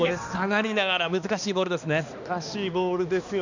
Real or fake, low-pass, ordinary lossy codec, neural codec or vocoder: fake; 7.2 kHz; none; codec, 16 kHz, 2 kbps, X-Codec, HuBERT features, trained on balanced general audio